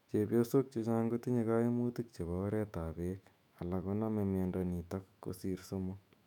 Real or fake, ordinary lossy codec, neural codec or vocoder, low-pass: fake; none; autoencoder, 48 kHz, 128 numbers a frame, DAC-VAE, trained on Japanese speech; 19.8 kHz